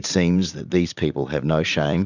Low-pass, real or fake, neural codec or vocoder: 7.2 kHz; fake; vocoder, 44.1 kHz, 80 mel bands, Vocos